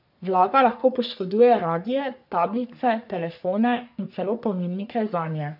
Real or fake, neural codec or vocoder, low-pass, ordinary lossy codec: fake; codec, 44.1 kHz, 3.4 kbps, Pupu-Codec; 5.4 kHz; none